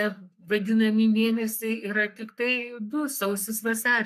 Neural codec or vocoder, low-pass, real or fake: codec, 44.1 kHz, 3.4 kbps, Pupu-Codec; 14.4 kHz; fake